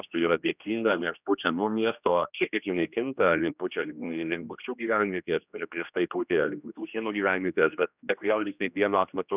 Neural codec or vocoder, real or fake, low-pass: codec, 16 kHz, 1 kbps, X-Codec, HuBERT features, trained on general audio; fake; 3.6 kHz